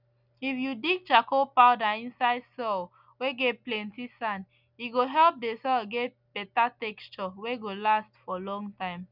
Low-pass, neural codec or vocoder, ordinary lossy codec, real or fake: 5.4 kHz; none; none; real